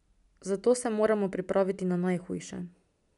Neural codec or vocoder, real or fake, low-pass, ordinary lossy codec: none; real; 10.8 kHz; none